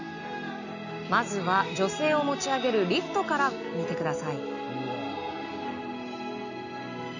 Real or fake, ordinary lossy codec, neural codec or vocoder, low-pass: real; none; none; 7.2 kHz